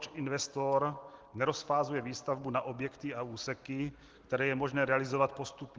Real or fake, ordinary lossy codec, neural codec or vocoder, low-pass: real; Opus, 16 kbps; none; 7.2 kHz